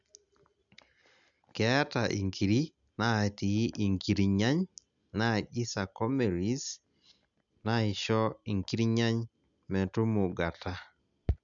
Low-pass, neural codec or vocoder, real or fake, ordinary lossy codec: 7.2 kHz; none; real; none